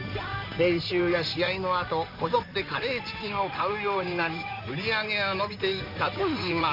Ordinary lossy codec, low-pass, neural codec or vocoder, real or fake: none; 5.4 kHz; codec, 16 kHz in and 24 kHz out, 2.2 kbps, FireRedTTS-2 codec; fake